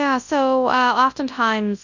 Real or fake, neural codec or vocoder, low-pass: fake; codec, 24 kHz, 0.9 kbps, WavTokenizer, large speech release; 7.2 kHz